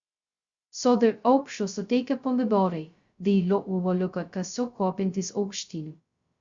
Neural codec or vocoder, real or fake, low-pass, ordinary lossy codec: codec, 16 kHz, 0.2 kbps, FocalCodec; fake; 7.2 kHz; Opus, 64 kbps